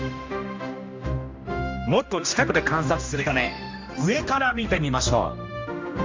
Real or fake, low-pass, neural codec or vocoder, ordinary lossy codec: fake; 7.2 kHz; codec, 16 kHz, 1 kbps, X-Codec, HuBERT features, trained on general audio; MP3, 48 kbps